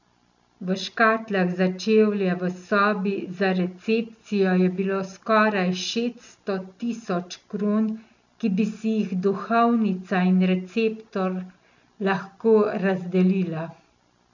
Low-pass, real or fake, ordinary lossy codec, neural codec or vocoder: 7.2 kHz; real; none; none